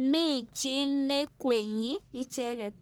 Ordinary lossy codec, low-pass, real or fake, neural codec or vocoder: none; none; fake; codec, 44.1 kHz, 1.7 kbps, Pupu-Codec